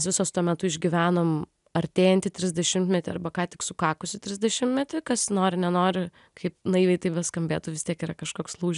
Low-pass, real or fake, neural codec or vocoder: 10.8 kHz; real; none